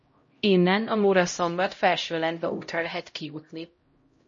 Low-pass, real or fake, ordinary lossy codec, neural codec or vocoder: 7.2 kHz; fake; MP3, 32 kbps; codec, 16 kHz, 0.5 kbps, X-Codec, HuBERT features, trained on LibriSpeech